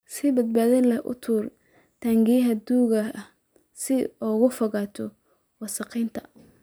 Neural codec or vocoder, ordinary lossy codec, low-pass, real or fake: none; none; none; real